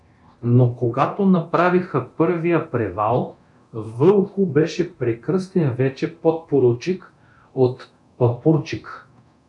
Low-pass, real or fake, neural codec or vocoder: 10.8 kHz; fake; codec, 24 kHz, 0.9 kbps, DualCodec